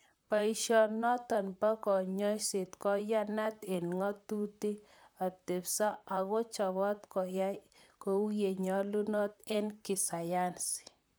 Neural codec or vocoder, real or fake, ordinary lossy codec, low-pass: vocoder, 44.1 kHz, 128 mel bands, Pupu-Vocoder; fake; none; none